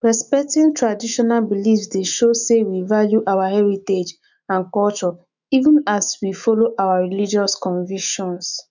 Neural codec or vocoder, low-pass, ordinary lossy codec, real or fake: autoencoder, 48 kHz, 128 numbers a frame, DAC-VAE, trained on Japanese speech; 7.2 kHz; none; fake